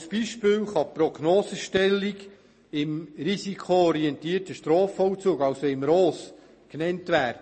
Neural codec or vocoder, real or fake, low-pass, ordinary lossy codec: none; real; 10.8 kHz; MP3, 32 kbps